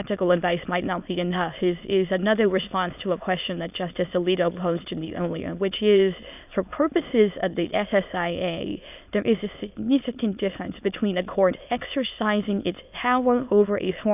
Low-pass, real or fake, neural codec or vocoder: 3.6 kHz; fake; autoencoder, 22.05 kHz, a latent of 192 numbers a frame, VITS, trained on many speakers